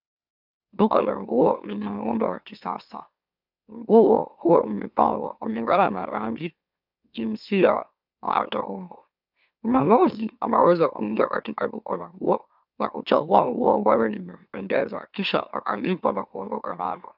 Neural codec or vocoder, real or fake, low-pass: autoencoder, 44.1 kHz, a latent of 192 numbers a frame, MeloTTS; fake; 5.4 kHz